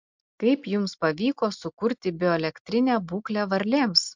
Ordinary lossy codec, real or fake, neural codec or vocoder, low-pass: MP3, 64 kbps; real; none; 7.2 kHz